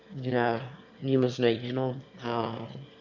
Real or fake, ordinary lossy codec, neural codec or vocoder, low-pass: fake; none; autoencoder, 22.05 kHz, a latent of 192 numbers a frame, VITS, trained on one speaker; 7.2 kHz